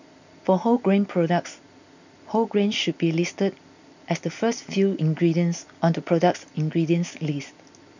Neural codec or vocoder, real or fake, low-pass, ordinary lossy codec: none; real; 7.2 kHz; none